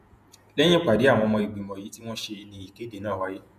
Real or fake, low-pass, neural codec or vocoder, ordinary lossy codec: fake; 14.4 kHz; vocoder, 48 kHz, 128 mel bands, Vocos; none